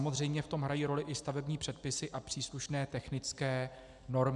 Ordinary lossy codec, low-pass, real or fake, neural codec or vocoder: MP3, 96 kbps; 10.8 kHz; real; none